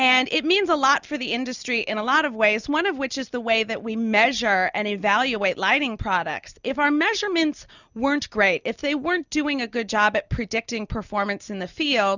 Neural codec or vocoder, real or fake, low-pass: none; real; 7.2 kHz